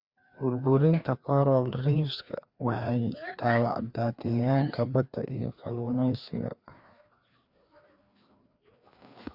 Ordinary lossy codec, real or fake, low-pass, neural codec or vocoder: Opus, 64 kbps; fake; 5.4 kHz; codec, 16 kHz, 2 kbps, FreqCodec, larger model